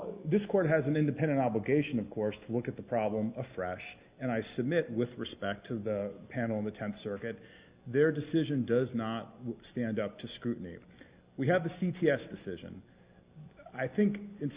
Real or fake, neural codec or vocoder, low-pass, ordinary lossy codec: real; none; 3.6 kHz; Opus, 64 kbps